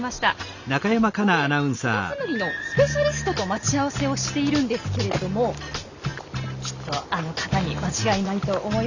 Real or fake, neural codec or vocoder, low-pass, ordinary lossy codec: real; none; 7.2 kHz; none